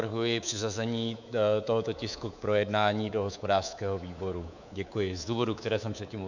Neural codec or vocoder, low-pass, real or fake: codec, 24 kHz, 3.1 kbps, DualCodec; 7.2 kHz; fake